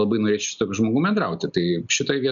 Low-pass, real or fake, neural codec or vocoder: 7.2 kHz; real; none